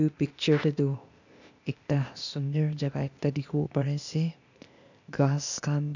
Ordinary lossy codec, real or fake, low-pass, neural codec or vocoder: none; fake; 7.2 kHz; codec, 16 kHz, 0.8 kbps, ZipCodec